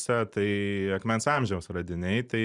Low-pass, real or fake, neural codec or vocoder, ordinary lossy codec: 10.8 kHz; real; none; Opus, 64 kbps